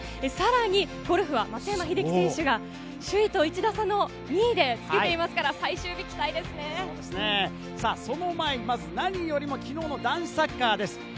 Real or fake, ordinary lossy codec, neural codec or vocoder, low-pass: real; none; none; none